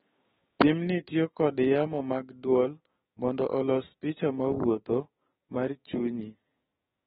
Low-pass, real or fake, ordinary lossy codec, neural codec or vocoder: 7.2 kHz; fake; AAC, 16 kbps; codec, 16 kHz, 6 kbps, DAC